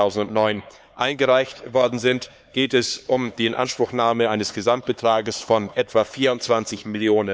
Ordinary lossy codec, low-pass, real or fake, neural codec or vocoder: none; none; fake; codec, 16 kHz, 4 kbps, X-Codec, HuBERT features, trained on LibriSpeech